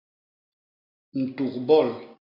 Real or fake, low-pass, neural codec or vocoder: real; 5.4 kHz; none